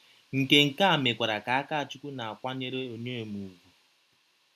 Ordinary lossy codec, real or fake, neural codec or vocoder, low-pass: MP3, 96 kbps; real; none; 14.4 kHz